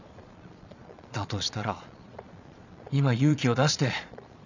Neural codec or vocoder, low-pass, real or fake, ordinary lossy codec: vocoder, 22.05 kHz, 80 mel bands, Vocos; 7.2 kHz; fake; none